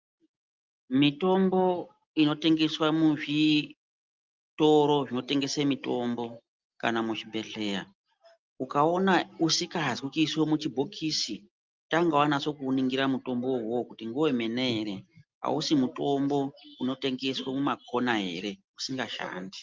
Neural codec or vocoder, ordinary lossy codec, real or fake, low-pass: none; Opus, 32 kbps; real; 7.2 kHz